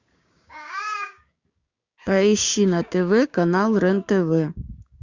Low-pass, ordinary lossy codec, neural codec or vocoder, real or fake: 7.2 kHz; Opus, 64 kbps; codec, 16 kHz, 6 kbps, DAC; fake